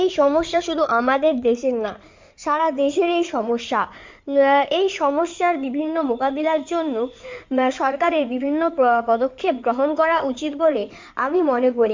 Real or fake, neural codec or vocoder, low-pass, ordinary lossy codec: fake; codec, 16 kHz in and 24 kHz out, 2.2 kbps, FireRedTTS-2 codec; 7.2 kHz; MP3, 64 kbps